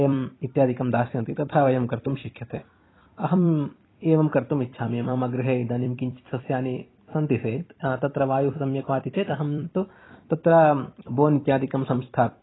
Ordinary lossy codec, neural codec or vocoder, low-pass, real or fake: AAC, 16 kbps; vocoder, 44.1 kHz, 128 mel bands every 256 samples, BigVGAN v2; 7.2 kHz; fake